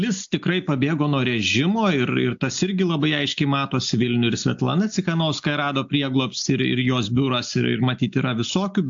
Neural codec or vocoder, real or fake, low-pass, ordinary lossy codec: none; real; 7.2 kHz; AAC, 64 kbps